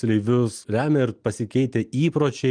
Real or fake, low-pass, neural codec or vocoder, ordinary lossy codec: real; 9.9 kHz; none; Opus, 32 kbps